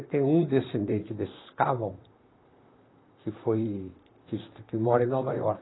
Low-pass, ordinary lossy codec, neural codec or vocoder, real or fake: 7.2 kHz; AAC, 16 kbps; vocoder, 44.1 kHz, 128 mel bands, Pupu-Vocoder; fake